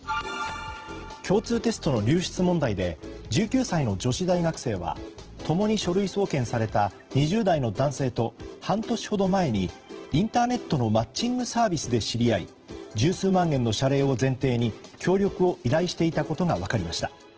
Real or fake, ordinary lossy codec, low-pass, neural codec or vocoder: fake; Opus, 16 kbps; 7.2 kHz; vocoder, 44.1 kHz, 128 mel bands every 512 samples, BigVGAN v2